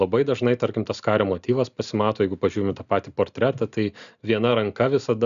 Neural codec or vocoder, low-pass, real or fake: none; 7.2 kHz; real